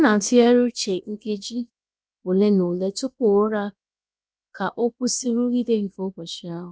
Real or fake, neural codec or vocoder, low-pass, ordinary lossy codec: fake; codec, 16 kHz, 0.7 kbps, FocalCodec; none; none